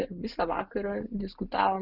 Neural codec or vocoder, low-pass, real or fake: none; 5.4 kHz; real